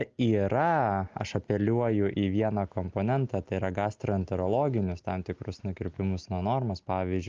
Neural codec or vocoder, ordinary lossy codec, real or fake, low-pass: none; Opus, 24 kbps; real; 7.2 kHz